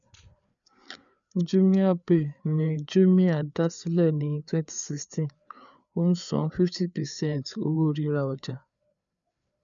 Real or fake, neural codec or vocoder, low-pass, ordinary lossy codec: fake; codec, 16 kHz, 4 kbps, FreqCodec, larger model; 7.2 kHz; none